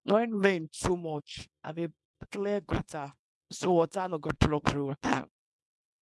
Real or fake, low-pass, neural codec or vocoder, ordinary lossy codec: fake; none; codec, 24 kHz, 0.9 kbps, WavTokenizer, small release; none